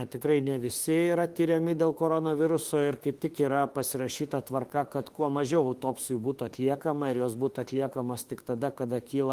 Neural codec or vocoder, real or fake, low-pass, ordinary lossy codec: autoencoder, 48 kHz, 32 numbers a frame, DAC-VAE, trained on Japanese speech; fake; 14.4 kHz; Opus, 24 kbps